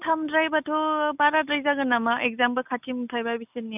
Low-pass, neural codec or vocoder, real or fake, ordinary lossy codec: 3.6 kHz; none; real; none